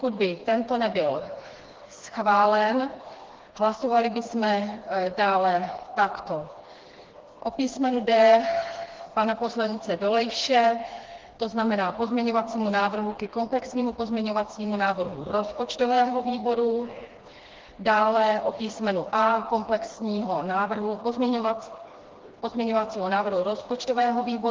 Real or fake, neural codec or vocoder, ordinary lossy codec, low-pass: fake; codec, 16 kHz, 2 kbps, FreqCodec, smaller model; Opus, 16 kbps; 7.2 kHz